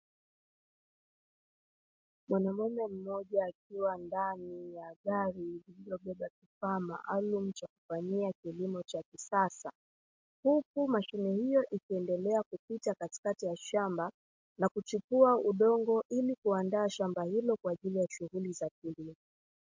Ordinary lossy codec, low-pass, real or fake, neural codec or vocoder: MP3, 48 kbps; 7.2 kHz; real; none